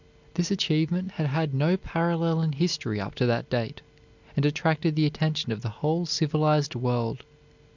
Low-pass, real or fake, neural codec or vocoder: 7.2 kHz; real; none